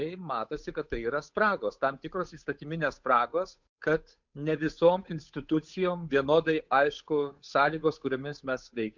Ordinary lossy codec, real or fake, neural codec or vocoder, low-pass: MP3, 64 kbps; fake; codec, 16 kHz, 8 kbps, FunCodec, trained on Chinese and English, 25 frames a second; 7.2 kHz